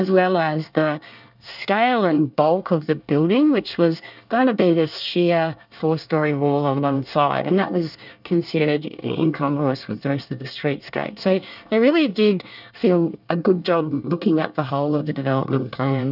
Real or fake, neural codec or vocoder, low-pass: fake; codec, 24 kHz, 1 kbps, SNAC; 5.4 kHz